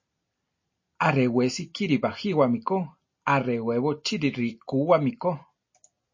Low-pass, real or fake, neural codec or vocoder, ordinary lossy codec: 7.2 kHz; real; none; MP3, 32 kbps